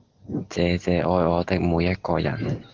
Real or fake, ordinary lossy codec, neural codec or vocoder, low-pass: real; Opus, 16 kbps; none; 7.2 kHz